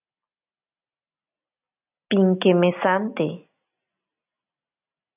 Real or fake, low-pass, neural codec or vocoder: real; 3.6 kHz; none